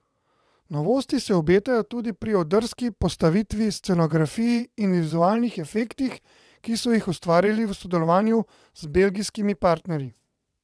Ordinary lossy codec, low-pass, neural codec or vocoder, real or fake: none; none; vocoder, 22.05 kHz, 80 mel bands, WaveNeXt; fake